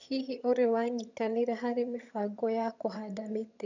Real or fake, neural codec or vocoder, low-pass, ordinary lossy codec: fake; vocoder, 22.05 kHz, 80 mel bands, HiFi-GAN; 7.2 kHz; none